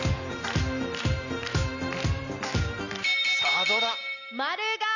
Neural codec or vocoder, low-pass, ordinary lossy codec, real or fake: none; 7.2 kHz; none; real